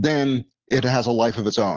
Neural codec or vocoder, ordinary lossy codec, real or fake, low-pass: none; Opus, 16 kbps; real; 7.2 kHz